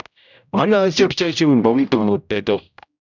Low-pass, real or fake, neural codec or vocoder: 7.2 kHz; fake; codec, 16 kHz, 0.5 kbps, X-Codec, HuBERT features, trained on general audio